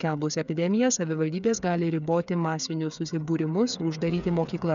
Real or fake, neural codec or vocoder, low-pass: fake; codec, 16 kHz, 8 kbps, FreqCodec, smaller model; 7.2 kHz